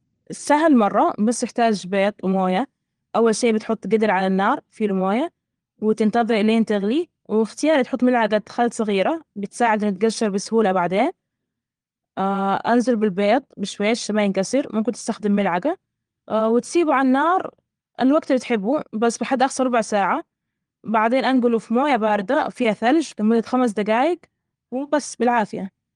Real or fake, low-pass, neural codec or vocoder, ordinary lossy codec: fake; 9.9 kHz; vocoder, 22.05 kHz, 80 mel bands, WaveNeXt; Opus, 24 kbps